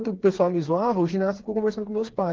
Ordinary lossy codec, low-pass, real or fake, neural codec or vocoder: Opus, 16 kbps; 7.2 kHz; fake; codec, 16 kHz, 4 kbps, FreqCodec, smaller model